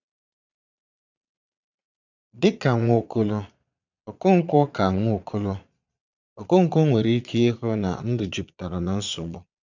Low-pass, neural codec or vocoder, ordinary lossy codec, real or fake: 7.2 kHz; vocoder, 22.05 kHz, 80 mel bands, Vocos; none; fake